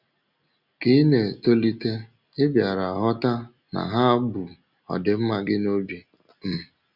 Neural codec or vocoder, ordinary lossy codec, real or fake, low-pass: none; Opus, 64 kbps; real; 5.4 kHz